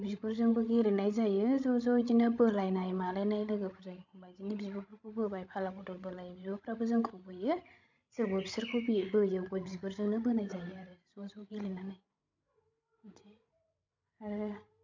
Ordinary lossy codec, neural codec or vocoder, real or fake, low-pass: MP3, 64 kbps; codec, 16 kHz, 16 kbps, FreqCodec, larger model; fake; 7.2 kHz